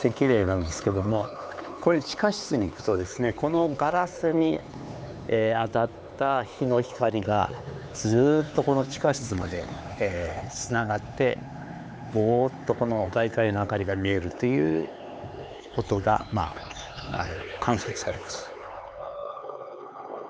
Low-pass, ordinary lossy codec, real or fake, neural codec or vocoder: none; none; fake; codec, 16 kHz, 4 kbps, X-Codec, HuBERT features, trained on LibriSpeech